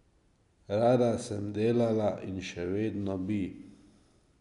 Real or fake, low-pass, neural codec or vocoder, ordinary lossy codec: real; 10.8 kHz; none; none